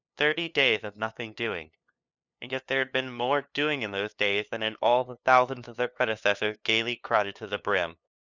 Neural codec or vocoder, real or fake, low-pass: codec, 16 kHz, 2 kbps, FunCodec, trained on LibriTTS, 25 frames a second; fake; 7.2 kHz